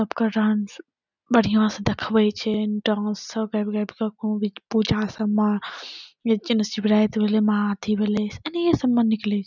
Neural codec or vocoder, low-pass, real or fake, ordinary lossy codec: none; 7.2 kHz; real; none